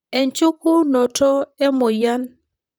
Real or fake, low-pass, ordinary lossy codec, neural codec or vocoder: fake; none; none; vocoder, 44.1 kHz, 128 mel bands, Pupu-Vocoder